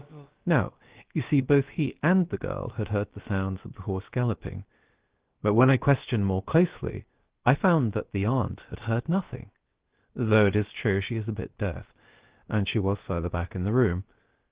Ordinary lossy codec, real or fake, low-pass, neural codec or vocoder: Opus, 16 kbps; fake; 3.6 kHz; codec, 16 kHz, about 1 kbps, DyCAST, with the encoder's durations